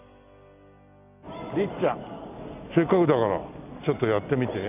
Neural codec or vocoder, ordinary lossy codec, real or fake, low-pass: none; none; real; 3.6 kHz